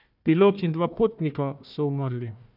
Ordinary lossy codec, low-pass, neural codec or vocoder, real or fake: none; 5.4 kHz; codec, 16 kHz, 1 kbps, FunCodec, trained on Chinese and English, 50 frames a second; fake